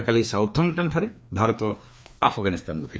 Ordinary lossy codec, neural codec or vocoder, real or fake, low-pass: none; codec, 16 kHz, 2 kbps, FreqCodec, larger model; fake; none